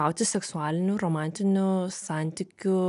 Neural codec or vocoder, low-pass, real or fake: none; 10.8 kHz; real